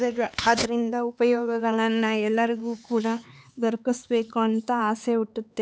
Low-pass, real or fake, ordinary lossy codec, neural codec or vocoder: none; fake; none; codec, 16 kHz, 4 kbps, X-Codec, HuBERT features, trained on LibriSpeech